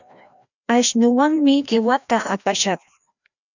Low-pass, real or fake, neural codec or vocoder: 7.2 kHz; fake; codec, 16 kHz, 1 kbps, FreqCodec, larger model